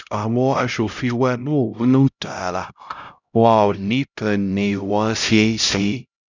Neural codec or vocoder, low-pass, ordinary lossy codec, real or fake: codec, 16 kHz, 0.5 kbps, X-Codec, HuBERT features, trained on LibriSpeech; 7.2 kHz; none; fake